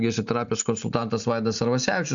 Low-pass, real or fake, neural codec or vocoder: 7.2 kHz; real; none